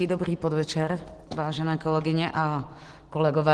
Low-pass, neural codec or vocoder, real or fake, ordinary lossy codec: 10.8 kHz; none; real; Opus, 16 kbps